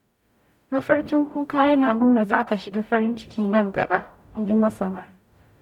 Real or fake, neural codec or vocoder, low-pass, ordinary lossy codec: fake; codec, 44.1 kHz, 0.9 kbps, DAC; 19.8 kHz; none